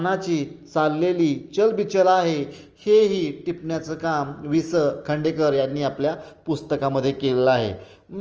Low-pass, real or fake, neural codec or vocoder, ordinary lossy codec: 7.2 kHz; real; none; Opus, 24 kbps